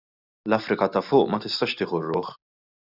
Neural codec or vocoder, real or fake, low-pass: none; real; 5.4 kHz